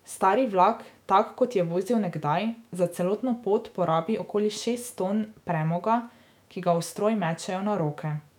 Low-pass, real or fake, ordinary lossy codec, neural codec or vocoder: 19.8 kHz; fake; none; autoencoder, 48 kHz, 128 numbers a frame, DAC-VAE, trained on Japanese speech